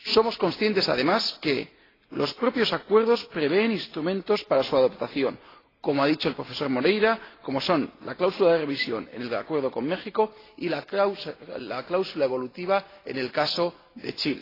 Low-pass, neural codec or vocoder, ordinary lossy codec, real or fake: 5.4 kHz; none; AAC, 24 kbps; real